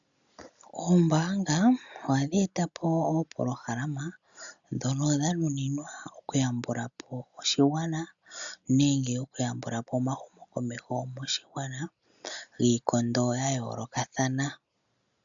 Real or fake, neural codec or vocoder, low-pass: real; none; 7.2 kHz